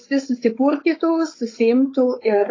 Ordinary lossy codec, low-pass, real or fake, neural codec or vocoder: AAC, 32 kbps; 7.2 kHz; fake; codec, 16 kHz, 4.8 kbps, FACodec